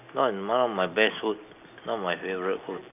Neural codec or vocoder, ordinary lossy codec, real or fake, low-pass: none; none; real; 3.6 kHz